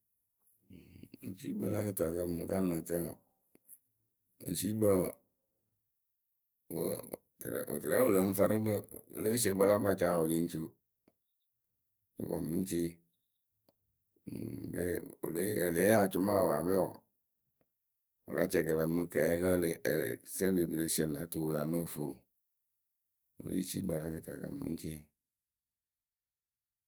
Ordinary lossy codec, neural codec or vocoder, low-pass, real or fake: none; codec, 44.1 kHz, 2.6 kbps, SNAC; none; fake